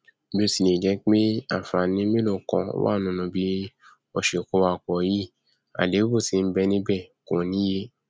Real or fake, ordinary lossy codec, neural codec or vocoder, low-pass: real; none; none; none